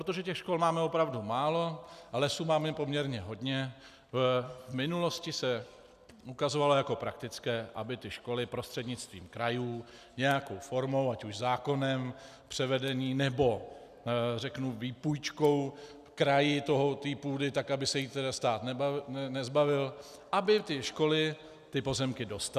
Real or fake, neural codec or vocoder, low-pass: real; none; 14.4 kHz